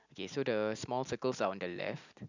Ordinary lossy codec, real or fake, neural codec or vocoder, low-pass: none; real; none; 7.2 kHz